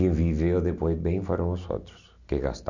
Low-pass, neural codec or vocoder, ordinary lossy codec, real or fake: 7.2 kHz; none; none; real